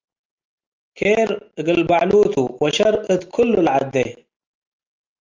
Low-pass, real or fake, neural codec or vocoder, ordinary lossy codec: 7.2 kHz; real; none; Opus, 24 kbps